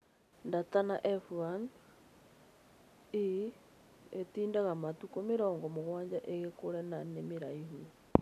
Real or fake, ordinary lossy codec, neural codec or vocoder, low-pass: real; AAC, 96 kbps; none; 14.4 kHz